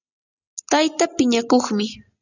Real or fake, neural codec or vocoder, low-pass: real; none; 7.2 kHz